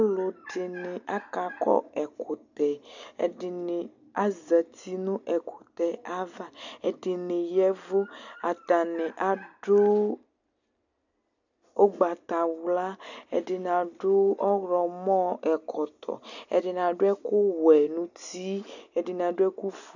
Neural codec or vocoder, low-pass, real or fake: none; 7.2 kHz; real